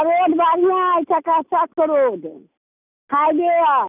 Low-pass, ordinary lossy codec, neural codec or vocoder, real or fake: 3.6 kHz; none; none; real